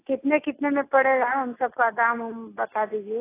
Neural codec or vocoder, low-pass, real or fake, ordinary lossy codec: none; 3.6 kHz; real; AAC, 16 kbps